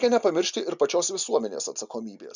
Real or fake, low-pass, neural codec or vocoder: real; 7.2 kHz; none